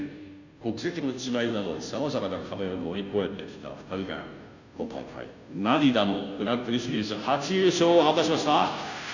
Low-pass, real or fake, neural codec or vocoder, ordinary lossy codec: 7.2 kHz; fake; codec, 16 kHz, 0.5 kbps, FunCodec, trained on Chinese and English, 25 frames a second; none